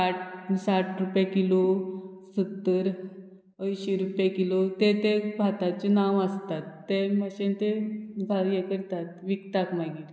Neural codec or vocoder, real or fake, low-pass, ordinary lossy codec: none; real; none; none